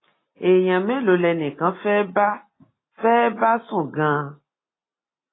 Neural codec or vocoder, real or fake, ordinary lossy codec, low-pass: none; real; AAC, 16 kbps; 7.2 kHz